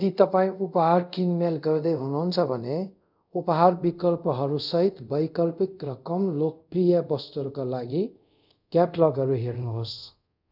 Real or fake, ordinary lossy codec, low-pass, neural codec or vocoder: fake; none; 5.4 kHz; codec, 24 kHz, 0.5 kbps, DualCodec